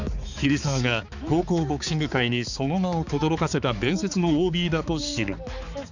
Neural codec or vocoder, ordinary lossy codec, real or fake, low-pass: codec, 16 kHz, 4 kbps, X-Codec, HuBERT features, trained on balanced general audio; none; fake; 7.2 kHz